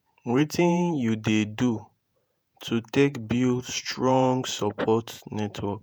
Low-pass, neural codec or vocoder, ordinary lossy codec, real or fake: none; vocoder, 48 kHz, 128 mel bands, Vocos; none; fake